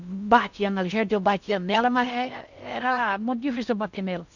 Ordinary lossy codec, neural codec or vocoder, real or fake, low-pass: none; codec, 16 kHz in and 24 kHz out, 0.6 kbps, FocalCodec, streaming, 4096 codes; fake; 7.2 kHz